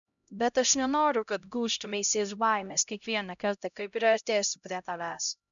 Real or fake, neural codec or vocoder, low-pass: fake; codec, 16 kHz, 0.5 kbps, X-Codec, HuBERT features, trained on LibriSpeech; 7.2 kHz